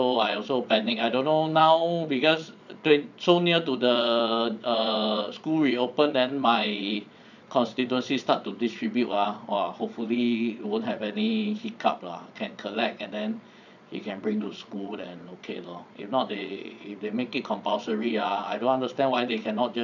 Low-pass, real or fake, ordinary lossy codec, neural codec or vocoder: 7.2 kHz; fake; none; vocoder, 22.05 kHz, 80 mel bands, Vocos